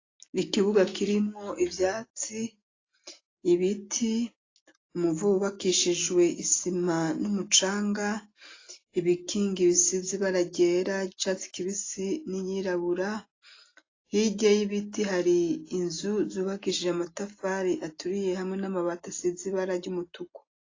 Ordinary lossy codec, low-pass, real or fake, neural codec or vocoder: AAC, 32 kbps; 7.2 kHz; real; none